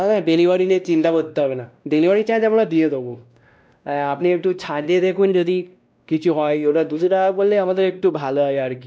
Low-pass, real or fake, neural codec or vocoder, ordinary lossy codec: none; fake; codec, 16 kHz, 1 kbps, X-Codec, WavLM features, trained on Multilingual LibriSpeech; none